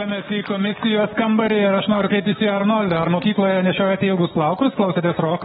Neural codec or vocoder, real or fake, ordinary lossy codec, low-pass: codec, 44.1 kHz, 7.8 kbps, DAC; fake; AAC, 16 kbps; 19.8 kHz